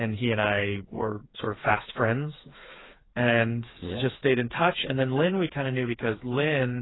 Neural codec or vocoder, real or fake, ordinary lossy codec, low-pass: codec, 16 kHz, 4 kbps, FreqCodec, smaller model; fake; AAC, 16 kbps; 7.2 kHz